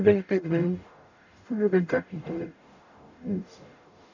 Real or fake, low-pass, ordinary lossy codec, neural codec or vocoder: fake; 7.2 kHz; none; codec, 44.1 kHz, 0.9 kbps, DAC